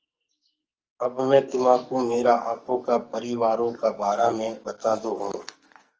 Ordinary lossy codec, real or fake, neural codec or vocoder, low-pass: Opus, 24 kbps; fake; codec, 44.1 kHz, 2.6 kbps, SNAC; 7.2 kHz